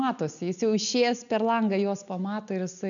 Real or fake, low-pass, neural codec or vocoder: real; 7.2 kHz; none